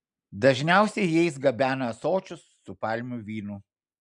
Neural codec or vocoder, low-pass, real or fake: none; 10.8 kHz; real